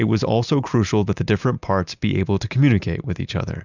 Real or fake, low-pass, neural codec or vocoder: real; 7.2 kHz; none